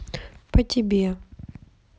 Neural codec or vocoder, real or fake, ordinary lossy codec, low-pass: none; real; none; none